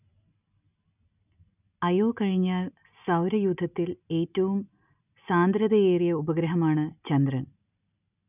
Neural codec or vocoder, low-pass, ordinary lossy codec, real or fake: none; 3.6 kHz; none; real